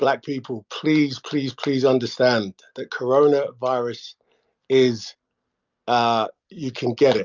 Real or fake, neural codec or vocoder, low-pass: real; none; 7.2 kHz